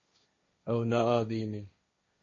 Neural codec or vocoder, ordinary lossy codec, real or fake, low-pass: codec, 16 kHz, 1.1 kbps, Voila-Tokenizer; MP3, 32 kbps; fake; 7.2 kHz